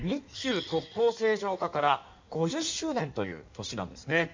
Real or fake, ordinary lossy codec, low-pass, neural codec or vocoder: fake; MP3, 64 kbps; 7.2 kHz; codec, 16 kHz in and 24 kHz out, 1.1 kbps, FireRedTTS-2 codec